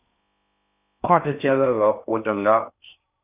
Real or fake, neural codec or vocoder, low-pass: fake; codec, 16 kHz in and 24 kHz out, 0.6 kbps, FocalCodec, streaming, 4096 codes; 3.6 kHz